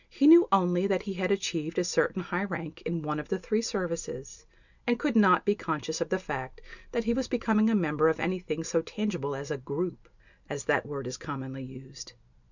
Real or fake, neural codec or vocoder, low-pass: real; none; 7.2 kHz